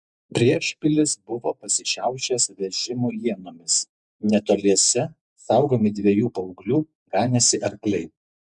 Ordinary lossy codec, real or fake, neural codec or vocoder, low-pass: MP3, 96 kbps; fake; vocoder, 48 kHz, 128 mel bands, Vocos; 10.8 kHz